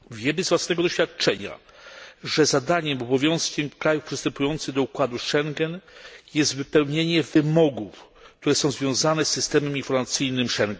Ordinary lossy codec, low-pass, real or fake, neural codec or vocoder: none; none; real; none